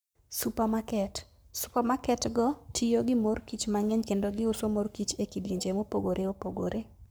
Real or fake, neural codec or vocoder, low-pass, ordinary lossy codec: fake; codec, 44.1 kHz, 7.8 kbps, Pupu-Codec; none; none